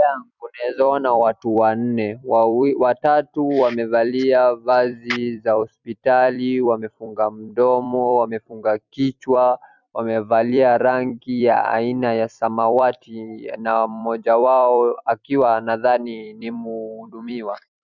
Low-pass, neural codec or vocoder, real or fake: 7.2 kHz; vocoder, 44.1 kHz, 128 mel bands every 256 samples, BigVGAN v2; fake